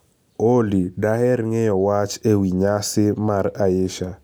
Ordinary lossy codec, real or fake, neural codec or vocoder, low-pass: none; real; none; none